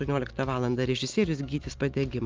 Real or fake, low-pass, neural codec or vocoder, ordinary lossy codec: real; 7.2 kHz; none; Opus, 24 kbps